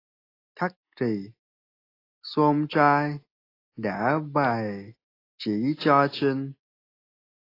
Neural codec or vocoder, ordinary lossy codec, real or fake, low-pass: none; AAC, 24 kbps; real; 5.4 kHz